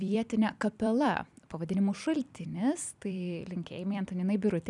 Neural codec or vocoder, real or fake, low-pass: vocoder, 44.1 kHz, 128 mel bands every 256 samples, BigVGAN v2; fake; 10.8 kHz